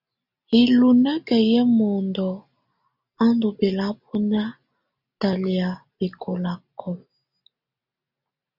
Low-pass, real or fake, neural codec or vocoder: 5.4 kHz; real; none